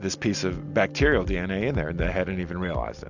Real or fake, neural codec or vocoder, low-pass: real; none; 7.2 kHz